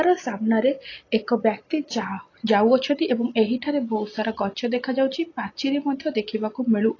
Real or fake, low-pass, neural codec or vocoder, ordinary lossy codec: real; 7.2 kHz; none; AAC, 32 kbps